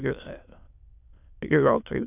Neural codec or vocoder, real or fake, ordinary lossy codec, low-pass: autoencoder, 22.05 kHz, a latent of 192 numbers a frame, VITS, trained on many speakers; fake; AAC, 32 kbps; 3.6 kHz